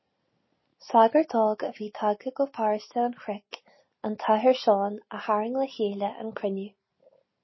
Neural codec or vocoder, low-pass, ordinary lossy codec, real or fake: none; 7.2 kHz; MP3, 24 kbps; real